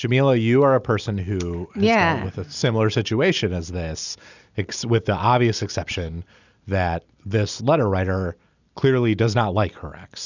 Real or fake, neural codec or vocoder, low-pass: real; none; 7.2 kHz